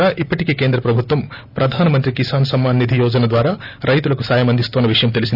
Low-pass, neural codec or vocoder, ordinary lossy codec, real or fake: 5.4 kHz; none; none; real